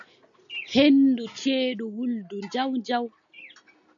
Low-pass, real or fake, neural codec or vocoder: 7.2 kHz; real; none